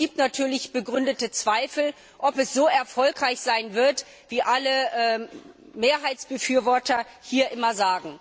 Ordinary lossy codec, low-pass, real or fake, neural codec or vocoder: none; none; real; none